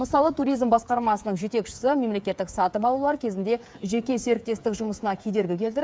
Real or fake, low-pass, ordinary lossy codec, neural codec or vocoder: fake; none; none; codec, 16 kHz, 8 kbps, FreqCodec, smaller model